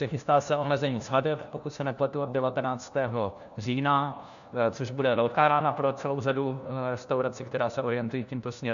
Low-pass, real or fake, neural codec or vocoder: 7.2 kHz; fake; codec, 16 kHz, 1 kbps, FunCodec, trained on LibriTTS, 50 frames a second